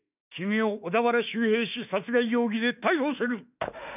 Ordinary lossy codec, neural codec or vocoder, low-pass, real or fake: none; autoencoder, 48 kHz, 32 numbers a frame, DAC-VAE, trained on Japanese speech; 3.6 kHz; fake